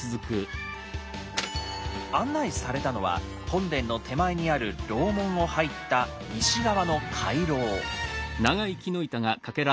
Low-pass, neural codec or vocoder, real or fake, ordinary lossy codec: none; none; real; none